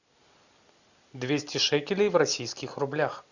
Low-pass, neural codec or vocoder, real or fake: 7.2 kHz; none; real